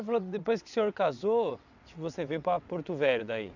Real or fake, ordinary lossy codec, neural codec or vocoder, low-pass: fake; none; vocoder, 22.05 kHz, 80 mel bands, WaveNeXt; 7.2 kHz